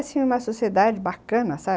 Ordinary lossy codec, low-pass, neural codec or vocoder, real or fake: none; none; none; real